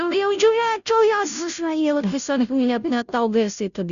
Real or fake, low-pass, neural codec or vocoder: fake; 7.2 kHz; codec, 16 kHz, 0.5 kbps, FunCodec, trained on Chinese and English, 25 frames a second